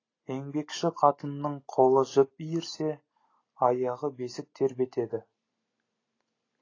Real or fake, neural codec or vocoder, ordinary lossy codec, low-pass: real; none; AAC, 32 kbps; 7.2 kHz